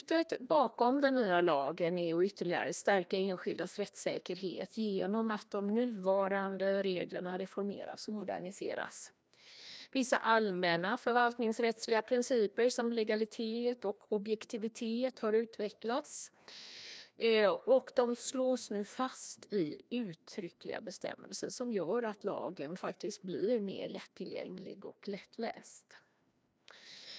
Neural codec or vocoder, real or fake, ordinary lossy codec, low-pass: codec, 16 kHz, 1 kbps, FreqCodec, larger model; fake; none; none